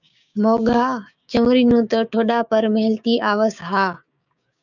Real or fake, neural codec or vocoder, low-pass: fake; codec, 16 kHz, 6 kbps, DAC; 7.2 kHz